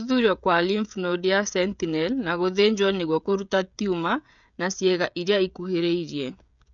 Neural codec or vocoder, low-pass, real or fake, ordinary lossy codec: codec, 16 kHz, 16 kbps, FreqCodec, smaller model; 7.2 kHz; fake; none